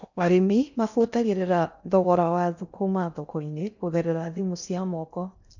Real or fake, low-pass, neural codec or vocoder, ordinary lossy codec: fake; 7.2 kHz; codec, 16 kHz in and 24 kHz out, 0.6 kbps, FocalCodec, streaming, 4096 codes; none